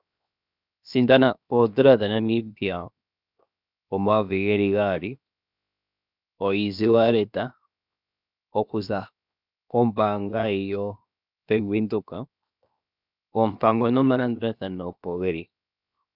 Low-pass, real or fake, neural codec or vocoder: 5.4 kHz; fake; codec, 16 kHz, 0.7 kbps, FocalCodec